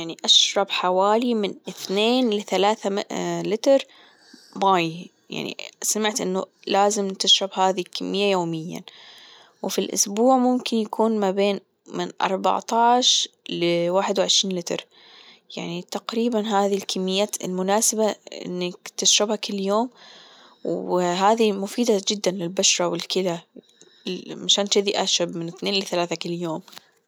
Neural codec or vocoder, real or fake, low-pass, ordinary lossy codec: none; real; none; none